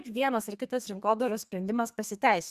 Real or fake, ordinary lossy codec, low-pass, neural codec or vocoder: fake; Opus, 64 kbps; 14.4 kHz; codec, 32 kHz, 1.9 kbps, SNAC